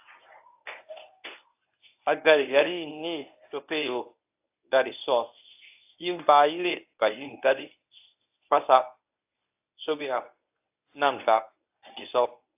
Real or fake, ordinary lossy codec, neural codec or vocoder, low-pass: fake; AAC, 32 kbps; codec, 24 kHz, 0.9 kbps, WavTokenizer, medium speech release version 1; 3.6 kHz